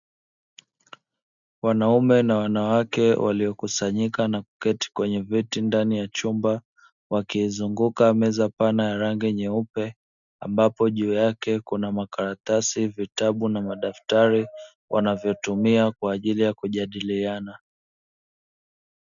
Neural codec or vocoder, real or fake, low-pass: none; real; 7.2 kHz